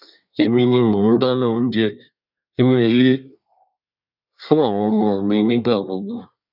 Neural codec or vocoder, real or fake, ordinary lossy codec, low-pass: codec, 24 kHz, 1 kbps, SNAC; fake; none; 5.4 kHz